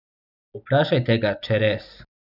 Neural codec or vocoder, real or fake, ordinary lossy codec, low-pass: none; real; none; 5.4 kHz